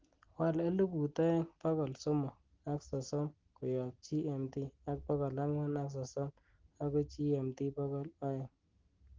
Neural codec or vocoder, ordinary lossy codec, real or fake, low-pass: none; Opus, 16 kbps; real; 7.2 kHz